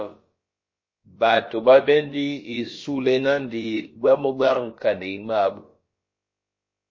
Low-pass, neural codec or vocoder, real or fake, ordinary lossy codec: 7.2 kHz; codec, 16 kHz, about 1 kbps, DyCAST, with the encoder's durations; fake; MP3, 32 kbps